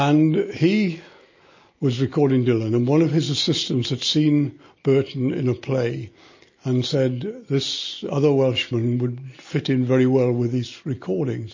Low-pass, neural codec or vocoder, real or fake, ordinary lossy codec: 7.2 kHz; none; real; MP3, 32 kbps